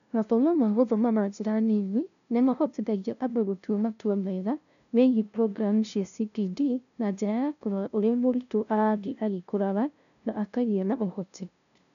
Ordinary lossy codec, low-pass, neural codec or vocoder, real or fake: none; 7.2 kHz; codec, 16 kHz, 0.5 kbps, FunCodec, trained on LibriTTS, 25 frames a second; fake